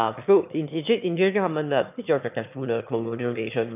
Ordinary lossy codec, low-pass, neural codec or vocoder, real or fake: none; 3.6 kHz; autoencoder, 22.05 kHz, a latent of 192 numbers a frame, VITS, trained on one speaker; fake